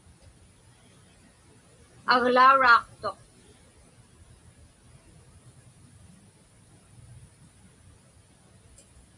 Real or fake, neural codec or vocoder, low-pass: real; none; 10.8 kHz